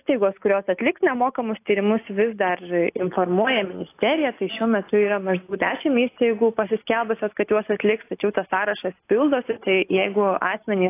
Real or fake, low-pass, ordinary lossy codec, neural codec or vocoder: real; 3.6 kHz; AAC, 24 kbps; none